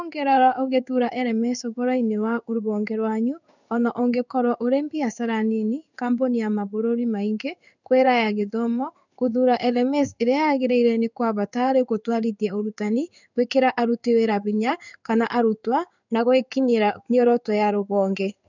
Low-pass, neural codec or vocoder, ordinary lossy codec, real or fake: 7.2 kHz; codec, 16 kHz in and 24 kHz out, 1 kbps, XY-Tokenizer; none; fake